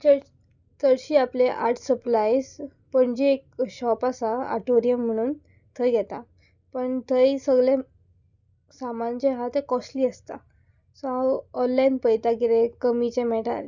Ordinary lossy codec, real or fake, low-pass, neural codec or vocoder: none; real; 7.2 kHz; none